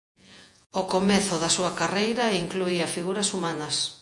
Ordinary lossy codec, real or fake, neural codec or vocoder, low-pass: MP3, 64 kbps; fake; vocoder, 48 kHz, 128 mel bands, Vocos; 10.8 kHz